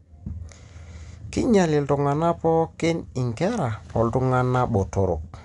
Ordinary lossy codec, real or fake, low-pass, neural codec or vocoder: none; real; 10.8 kHz; none